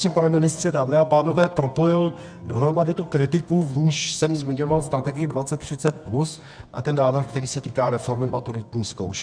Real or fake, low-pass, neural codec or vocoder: fake; 9.9 kHz; codec, 24 kHz, 0.9 kbps, WavTokenizer, medium music audio release